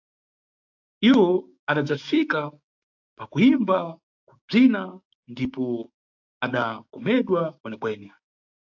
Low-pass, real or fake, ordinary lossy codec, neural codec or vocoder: 7.2 kHz; fake; AAC, 48 kbps; codec, 16 kHz, 6 kbps, DAC